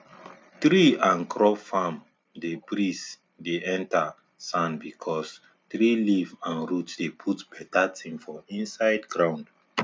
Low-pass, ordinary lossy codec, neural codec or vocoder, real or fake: none; none; none; real